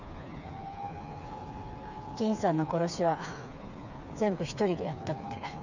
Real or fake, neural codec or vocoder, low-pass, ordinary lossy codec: fake; codec, 16 kHz, 4 kbps, FreqCodec, smaller model; 7.2 kHz; none